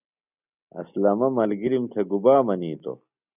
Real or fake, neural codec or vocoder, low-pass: real; none; 3.6 kHz